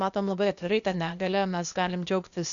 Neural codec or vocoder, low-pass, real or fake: codec, 16 kHz, 0.8 kbps, ZipCodec; 7.2 kHz; fake